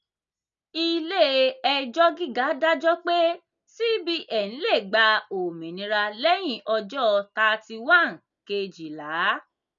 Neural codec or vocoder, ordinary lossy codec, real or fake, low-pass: none; none; real; 7.2 kHz